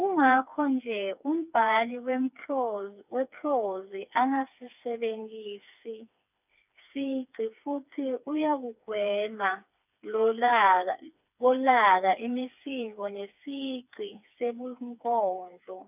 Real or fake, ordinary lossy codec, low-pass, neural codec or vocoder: fake; none; 3.6 kHz; codec, 16 kHz, 4 kbps, FreqCodec, smaller model